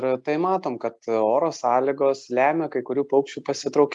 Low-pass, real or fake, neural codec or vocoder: 10.8 kHz; real; none